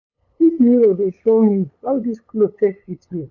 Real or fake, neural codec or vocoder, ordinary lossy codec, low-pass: fake; codec, 16 kHz, 8 kbps, FunCodec, trained on LibriTTS, 25 frames a second; none; 7.2 kHz